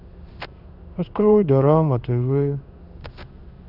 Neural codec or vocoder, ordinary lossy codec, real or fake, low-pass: codec, 16 kHz in and 24 kHz out, 1 kbps, XY-Tokenizer; none; fake; 5.4 kHz